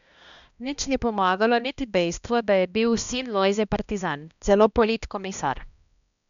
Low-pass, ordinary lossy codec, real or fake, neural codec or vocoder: 7.2 kHz; none; fake; codec, 16 kHz, 1 kbps, X-Codec, HuBERT features, trained on balanced general audio